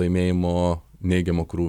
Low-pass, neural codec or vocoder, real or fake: 19.8 kHz; none; real